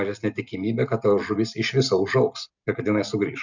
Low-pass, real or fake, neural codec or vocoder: 7.2 kHz; real; none